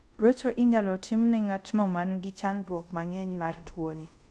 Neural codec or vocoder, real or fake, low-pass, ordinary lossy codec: codec, 24 kHz, 0.5 kbps, DualCodec; fake; none; none